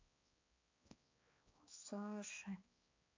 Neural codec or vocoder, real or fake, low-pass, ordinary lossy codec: codec, 16 kHz, 2 kbps, X-Codec, HuBERT features, trained on balanced general audio; fake; 7.2 kHz; AAC, 32 kbps